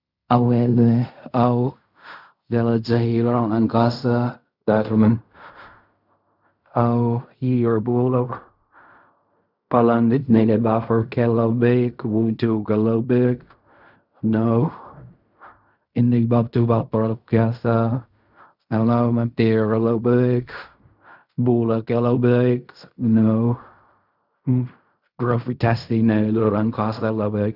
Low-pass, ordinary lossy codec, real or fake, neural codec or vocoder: 5.4 kHz; none; fake; codec, 16 kHz in and 24 kHz out, 0.4 kbps, LongCat-Audio-Codec, fine tuned four codebook decoder